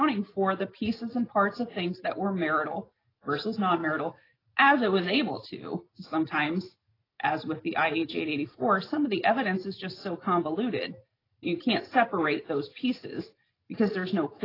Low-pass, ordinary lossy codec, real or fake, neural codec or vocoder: 5.4 kHz; AAC, 24 kbps; real; none